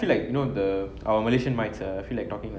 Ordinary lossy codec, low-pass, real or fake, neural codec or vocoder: none; none; real; none